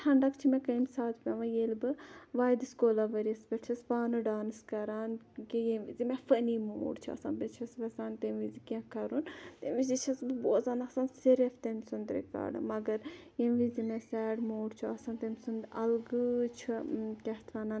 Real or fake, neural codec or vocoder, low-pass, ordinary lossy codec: real; none; none; none